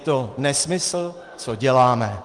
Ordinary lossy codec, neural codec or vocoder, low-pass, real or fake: Opus, 24 kbps; none; 10.8 kHz; real